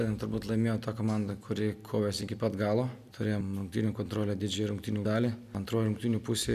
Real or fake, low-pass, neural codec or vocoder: real; 14.4 kHz; none